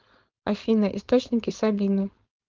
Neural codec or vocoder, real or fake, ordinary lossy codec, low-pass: codec, 16 kHz, 4.8 kbps, FACodec; fake; Opus, 24 kbps; 7.2 kHz